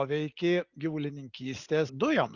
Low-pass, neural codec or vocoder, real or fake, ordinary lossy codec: 7.2 kHz; none; real; Opus, 64 kbps